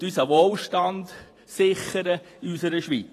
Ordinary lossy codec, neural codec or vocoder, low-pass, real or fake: AAC, 48 kbps; vocoder, 48 kHz, 128 mel bands, Vocos; 14.4 kHz; fake